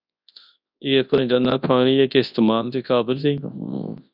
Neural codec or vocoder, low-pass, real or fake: codec, 24 kHz, 0.9 kbps, WavTokenizer, large speech release; 5.4 kHz; fake